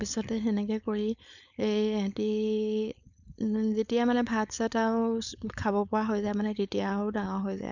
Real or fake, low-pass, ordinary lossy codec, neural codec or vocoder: fake; 7.2 kHz; none; codec, 16 kHz, 4.8 kbps, FACodec